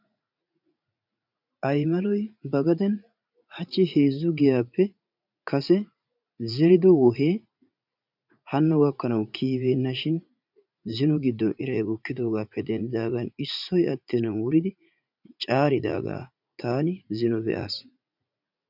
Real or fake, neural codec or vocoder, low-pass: fake; vocoder, 44.1 kHz, 80 mel bands, Vocos; 5.4 kHz